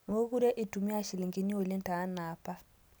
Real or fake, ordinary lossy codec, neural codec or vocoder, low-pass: real; none; none; none